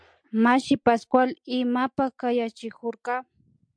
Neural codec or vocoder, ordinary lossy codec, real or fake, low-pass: none; MP3, 64 kbps; real; 9.9 kHz